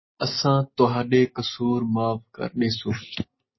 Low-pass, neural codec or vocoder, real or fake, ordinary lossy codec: 7.2 kHz; none; real; MP3, 24 kbps